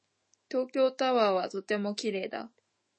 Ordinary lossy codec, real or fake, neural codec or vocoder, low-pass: MP3, 32 kbps; fake; autoencoder, 48 kHz, 128 numbers a frame, DAC-VAE, trained on Japanese speech; 9.9 kHz